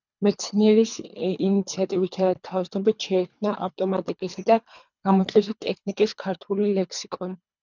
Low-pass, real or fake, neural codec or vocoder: 7.2 kHz; fake; codec, 24 kHz, 3 kbps, HILCodec